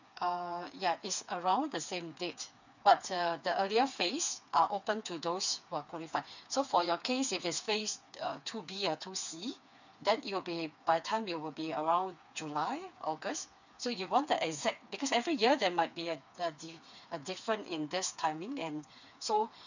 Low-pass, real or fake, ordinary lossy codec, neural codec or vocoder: 7.2 kHz; fake; none; codec, 16 kHz, 4 kbps, FreqCodec, smaller model